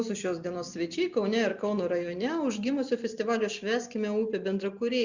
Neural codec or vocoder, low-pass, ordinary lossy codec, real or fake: none; 7.2 kHz; Opus, 64 kbps; real